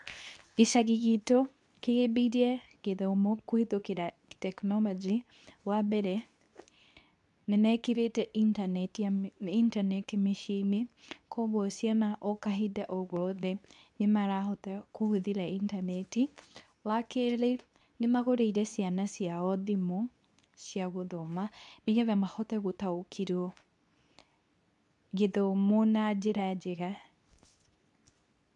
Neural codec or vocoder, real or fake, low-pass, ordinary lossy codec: codec, 24 kHz, 0.9 kbps, WavTokenizer, medium speech release version 2; fake; 10.8 kHz; none